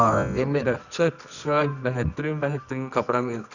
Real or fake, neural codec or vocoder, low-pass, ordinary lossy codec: fake; codec, 24 kHz, 0.9 kbps, WavTokenizer, medium music audio release; 7.2 kHz; none